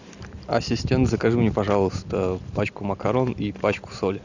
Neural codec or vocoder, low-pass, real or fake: none; 7.2 kHz; real